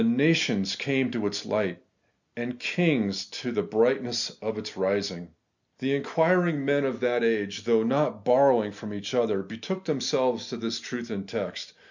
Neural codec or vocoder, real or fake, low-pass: none; real; 7.2 kHz